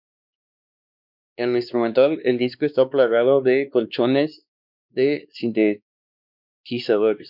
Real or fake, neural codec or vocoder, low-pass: fake; codec, 16 kHz, 2 kbps, X-Codec, WavLM features, trained on Multilingual LibriSpeech; 5.4 kHz